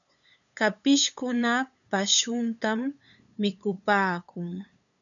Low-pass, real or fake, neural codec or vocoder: 7.2 kHz; fake; codec, 16 kHz, 8 kbps, FunCodec, trained on LibriTTS, 25 frames a second